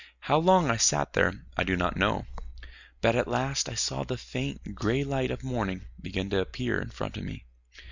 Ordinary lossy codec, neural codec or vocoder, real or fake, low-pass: Opus, 64 kbps; none; real; 7.2 kHz